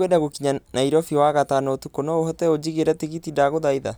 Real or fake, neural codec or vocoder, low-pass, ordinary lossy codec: real; none; none; none